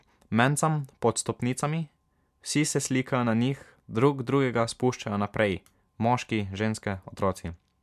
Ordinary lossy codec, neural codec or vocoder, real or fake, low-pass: MP3, 96 kbps; none; real; 14.4 kHz